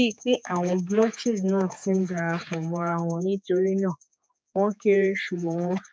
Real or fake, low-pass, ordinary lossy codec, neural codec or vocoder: fake; none; none; codec, 16 kHz, 4 kbps, X-Codec, HuBERT features, trained on general audio